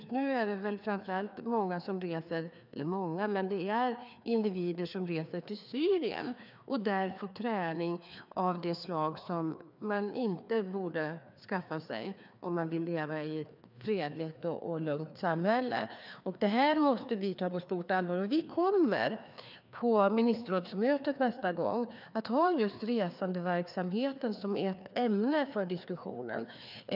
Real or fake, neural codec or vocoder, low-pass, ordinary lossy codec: fake; codec, 16 kHz, 2 kbps, FreqCodec, larger model; 5.4 kHz; none